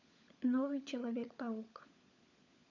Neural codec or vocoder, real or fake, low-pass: codec, 16 kHz, 16 kbps, FunCodec, trained on LibriTTS, 50 frames a second; fake; 7.2 kHz